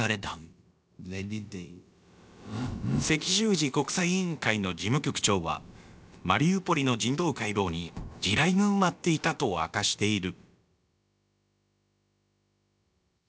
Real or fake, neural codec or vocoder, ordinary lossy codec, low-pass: fake; codec, 16 kHz, about 1 kbps, DyCAST, with the encoder's durations; none; none